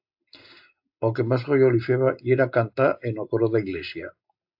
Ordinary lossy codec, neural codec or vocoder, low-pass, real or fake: AAC, 48 kbps; none; 5.4 kHz; real